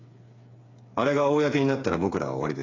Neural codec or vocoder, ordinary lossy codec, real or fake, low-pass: codec, 16 kHz, 8 kbps, FreqCodec, smaller model; AAC, 32 kbps; fake; 7.2 kHz